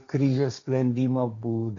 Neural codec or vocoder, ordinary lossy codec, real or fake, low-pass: codec, 16 kHz, 1.1 kbps, Voila-Tokenizer; MP3, 64 kbps; fake; 7.2 kHz